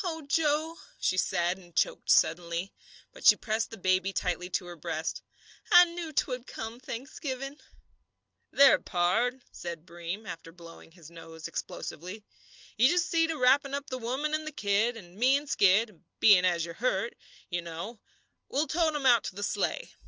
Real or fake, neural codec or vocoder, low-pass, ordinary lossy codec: real; none; 7.2 kHz; Opus, 24 kbps